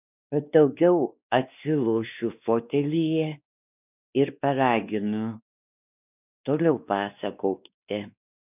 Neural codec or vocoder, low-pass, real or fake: codec, 16 kHz, 2 kbps, X-Codec, WavLM features, trained on Multilingual LibriSpeech; 3.6 kHz; fake